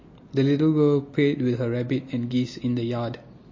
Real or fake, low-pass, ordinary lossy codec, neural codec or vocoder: real; 7.2 kHz; MP3, 32 kbps; none